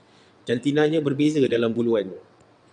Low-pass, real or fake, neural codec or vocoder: 9.9 kHz; fake; vocoder, 22.05 kHz, 80 mel bands, WaveNeXt